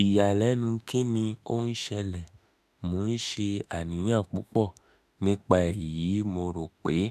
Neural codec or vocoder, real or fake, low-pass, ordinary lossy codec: autoencoder, 48 kHz, 32 numbers a frame, DAC-VAE, trained on Japanese speech; fake; 14.4 kHz; none